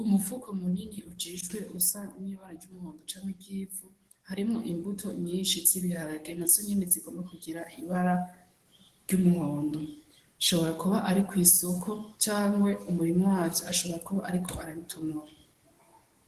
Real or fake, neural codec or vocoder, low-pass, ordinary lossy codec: fake; codec, 44.1 kHz, 7.8 kbps, DAC; 14.4 kHz; Opus, 16 kbps